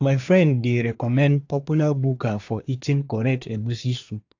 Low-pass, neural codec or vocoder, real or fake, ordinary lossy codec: 7.2 kHz; codec, 24 kHz, 1 kbps, SNAC; fake; AAC, 48 kbps